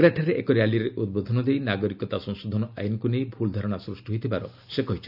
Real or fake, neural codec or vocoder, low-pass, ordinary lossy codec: real; none; 5.4 kHz; none